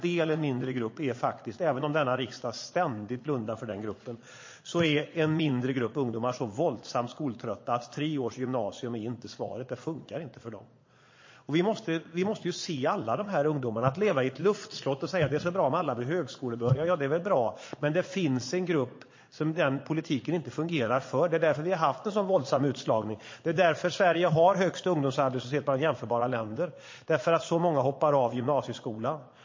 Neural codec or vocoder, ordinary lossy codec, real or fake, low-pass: vocoder, 22.05 kHz, 80 mel bands, Vocos; MP3, 32 kbps; fake; 7.2 kHz